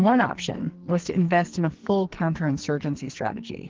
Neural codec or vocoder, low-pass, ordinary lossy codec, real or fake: codec, 44.1 kHz, 2.6 kbps, SNAC; 7.2 kHz; Opus, 16 kbps; fake